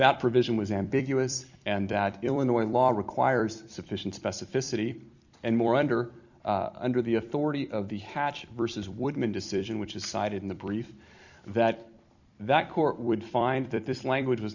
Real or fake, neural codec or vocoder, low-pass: fake; vocoder, 44.1 kHz, 128 mel bands every 256 samples, BigVGAN v2; 7.2 kHz